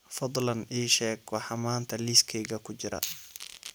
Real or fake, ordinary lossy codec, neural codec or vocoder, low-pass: real; none; none; none